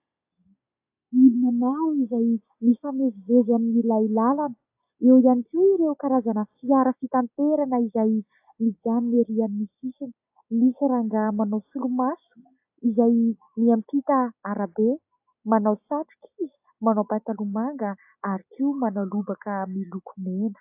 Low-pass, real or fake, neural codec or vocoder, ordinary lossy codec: 3.6 kHz; real; none; AAC, 32 kbps